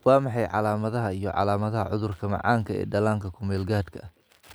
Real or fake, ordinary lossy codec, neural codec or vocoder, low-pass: real; none; none; none